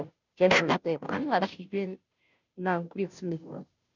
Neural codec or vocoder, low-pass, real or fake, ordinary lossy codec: codec, 16 kHz, 0.5 kbps, FunCodec, trained on Chinese and English, 25 frames a second; 7.2 kHz; fake; none